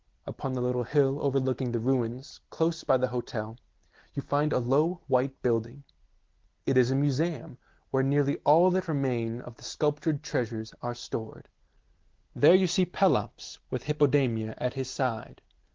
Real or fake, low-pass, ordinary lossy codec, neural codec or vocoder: real; 7.2 kHz; Opus, 16 kbps; none